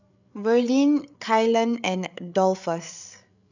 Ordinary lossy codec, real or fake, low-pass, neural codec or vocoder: none; fake; 7.2 kHz; codec, 16 kHz, 8 kbps, FreqCodec, larger model